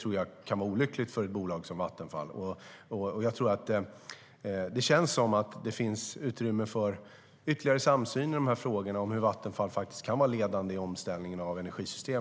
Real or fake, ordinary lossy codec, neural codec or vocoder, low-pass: real; none; none; none